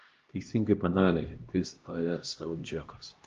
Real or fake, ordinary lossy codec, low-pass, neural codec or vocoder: fake; Opus, 32 kbps; 7.2 kHz; codec, 16 kHz, 1 kbps, X-Codec, HuBERT features, trained on LibriSpeech